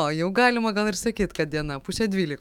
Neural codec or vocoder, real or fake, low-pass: autoencoder, 48 kHz, 128 numbers a frame, DAC-VAE, trained on Japanese speech; fake; 19.8 kHz